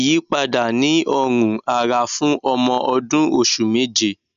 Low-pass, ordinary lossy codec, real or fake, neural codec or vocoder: 7.2 kHz; none; real; none